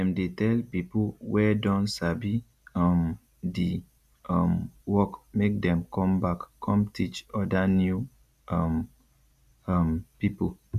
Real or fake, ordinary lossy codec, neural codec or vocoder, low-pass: real; none; none; 14.4 kHz